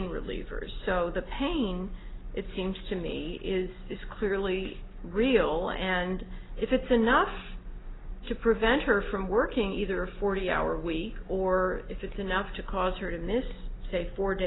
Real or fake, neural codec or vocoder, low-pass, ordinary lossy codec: real; none; 7.2 kHz; AAC, 16 kbps